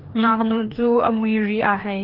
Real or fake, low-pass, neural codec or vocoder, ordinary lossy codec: fake; 5.4 kHz; codec, 16 kHz, 2 kbps, FreqCodec, larger model; Opus, 16 kbps